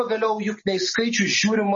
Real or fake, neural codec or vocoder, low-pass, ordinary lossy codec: real; none; 7.2 kHz; MP3, 32 kbps